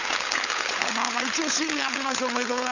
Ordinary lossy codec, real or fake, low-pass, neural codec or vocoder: none; fake; 7.2 kHz; codec, 16 kHz, 16 kbps, FunCodec, trained on LibriTTS, 50 frames a second